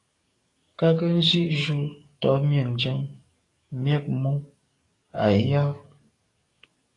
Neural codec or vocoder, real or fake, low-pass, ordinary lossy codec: codec, 44.1 kHz, 7.8 kbps, DAC; fake; 10.8 kHz; AAC, 32 kbps